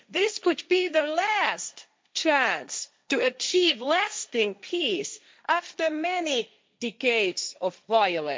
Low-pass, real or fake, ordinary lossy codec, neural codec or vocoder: none; fake; none; codec, 16 kHz, 1.1 kbps, Voila-Tokenizer